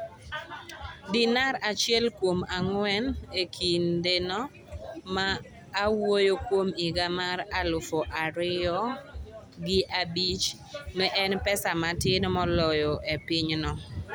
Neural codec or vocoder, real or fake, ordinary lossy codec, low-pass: none; real; none; none